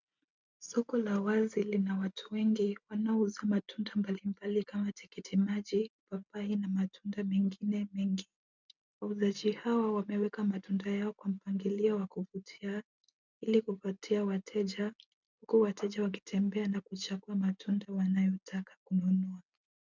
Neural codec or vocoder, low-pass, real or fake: none; 7.2 kHz; real